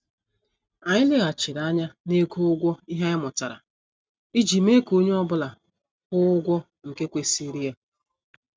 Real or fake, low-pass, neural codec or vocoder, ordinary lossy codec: real; none; none; none